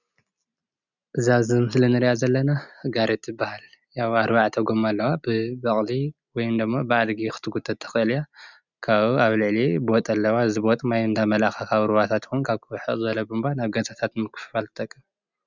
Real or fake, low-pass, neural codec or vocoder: real; 7.2 kHz; none